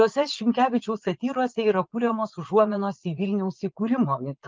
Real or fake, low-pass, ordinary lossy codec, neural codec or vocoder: fake; 7.2 kHz; Opus, 32 kbps; vocoder, 22.05 kHz, 80 mel bands, Vocos